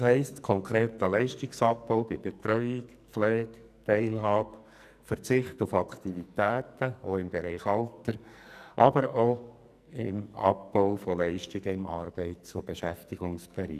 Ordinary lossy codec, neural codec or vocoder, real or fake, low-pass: none; codec, 44.1 kHz, 2.6 kbps, SNAC; fake; 14.4 kHz